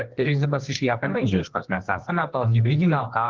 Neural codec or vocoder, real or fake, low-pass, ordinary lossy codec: codec, 24 kHz, 0.9 kbps, WavTokenizer, medium music audio release; fake; 7.2 kHz; Opus, 16 kbps